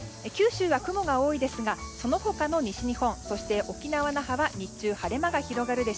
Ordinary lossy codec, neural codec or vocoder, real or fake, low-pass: none; none; real; none